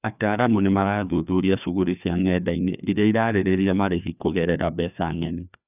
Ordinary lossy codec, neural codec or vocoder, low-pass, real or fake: none; codec, 16 kHz, 2 kbps, FreqCodec, larger model; 3.6 kHz; fake